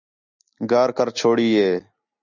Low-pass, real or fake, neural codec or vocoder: 7.2 kHz; real; none